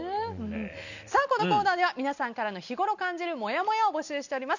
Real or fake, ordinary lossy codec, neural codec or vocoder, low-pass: real; none; none; 7.2 kHz